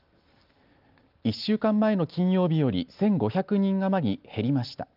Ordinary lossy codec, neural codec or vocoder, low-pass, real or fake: Opus, 32 kbps; none; 5.4 kHz; real